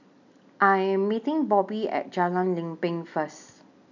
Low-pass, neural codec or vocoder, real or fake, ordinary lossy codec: 7.2 kHz; none; real; none